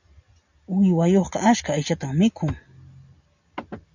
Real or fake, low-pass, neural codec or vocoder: real; 7.2 kHz; none